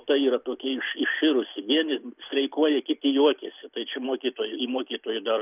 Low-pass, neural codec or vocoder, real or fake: 3.6 kHz; none; real